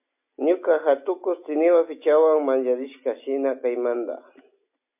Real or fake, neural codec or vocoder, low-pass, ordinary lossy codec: real; none; 3.6 kHz; MP3, 32 kbps